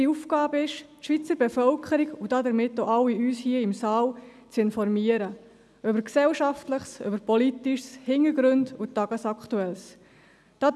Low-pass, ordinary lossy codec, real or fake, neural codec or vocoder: none; none; real; none